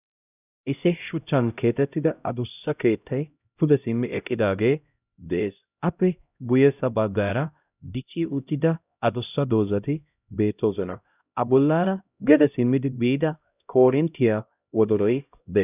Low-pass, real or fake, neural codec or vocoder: 3.6 kHz; fake; codec, 16 kHz, 0.5 kbps, X-Codec, HuBERT features, trained on LibriSpeech